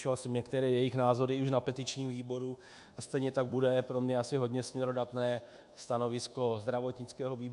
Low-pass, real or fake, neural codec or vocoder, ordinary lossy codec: 10.8 kHz; fake; codec, 24 kHz, 1.2 kbps, DualCodec; AAC, 96 kbps